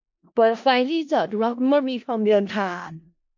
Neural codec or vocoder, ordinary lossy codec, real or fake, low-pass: codec, 16 kHz in and 24 kHz out, 0.4 kbps, LongCat-Audio-Codec, four codebook decoder; MP3, 48 kbps; fake; 7.2 kHz